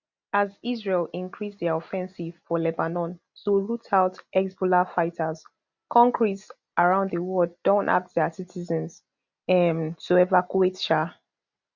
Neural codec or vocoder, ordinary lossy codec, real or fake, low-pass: none; none; real; 7.2 kHz